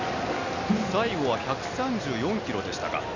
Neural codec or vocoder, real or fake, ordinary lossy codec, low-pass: none; real; none; 7.2 kHz